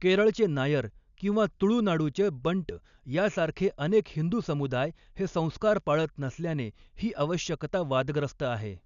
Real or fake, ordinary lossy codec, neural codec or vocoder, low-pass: real; none; none; 7.2 kHz